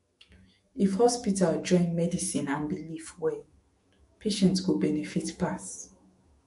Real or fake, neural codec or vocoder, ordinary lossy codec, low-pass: fake; autoencoder, 48 kHz, 128 numbers a frame, DAC-VAE, trained on Japanese speech; MP3, 48 kbps; 14.4 kHz